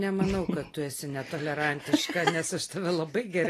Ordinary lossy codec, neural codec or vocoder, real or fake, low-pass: AAC, 48 kbps; none; real; 14.4 kHz